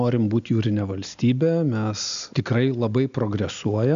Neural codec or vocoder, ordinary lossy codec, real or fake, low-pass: none; MP3, 64 kbps; real; 7.2 kHz